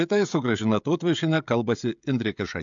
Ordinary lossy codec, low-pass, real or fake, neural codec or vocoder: MP3, 64 kbps; 7.2 kHz; fake; codec, 16 kHz, 16 kbps, FreqCodec, smaller model